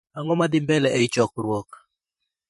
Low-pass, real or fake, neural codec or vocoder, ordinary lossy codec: 14.4 kHz; fake; vocoder, 44.1 kHz, 128 mel bands, Pupu-Vocoder; MP3, 48 kbps